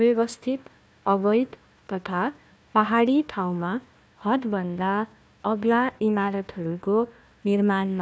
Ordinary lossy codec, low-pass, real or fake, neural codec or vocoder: none; none; fake; codec, 16 kHz, 1 kbps, FunCodec, trained on Chinese and English, 50 frames a second